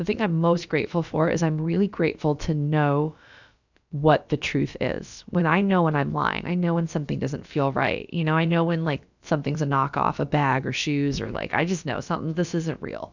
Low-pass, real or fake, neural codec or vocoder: 7.2 kHz; fake; codec, 16 kHz, about 1 kbps, DyCAST, with the encoder's durations